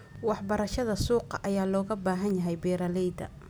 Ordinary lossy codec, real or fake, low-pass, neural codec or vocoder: none; real; none; none